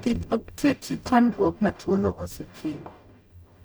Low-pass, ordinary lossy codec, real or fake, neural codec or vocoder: none; none; fake; codec, 44.1 kHz, 0.9 kbps, DAC